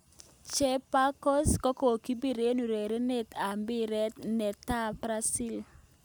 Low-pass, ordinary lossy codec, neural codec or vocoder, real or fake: none; none; none; real